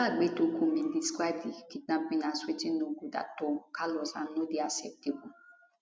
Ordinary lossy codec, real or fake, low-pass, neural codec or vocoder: none; real; none; none